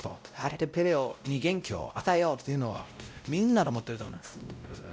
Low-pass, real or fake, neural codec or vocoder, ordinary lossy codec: none; fake; codec, 16 kHz, 0.5 kbps, X-Codec, WavLM features, trained on Multilingual LibriSpeech; none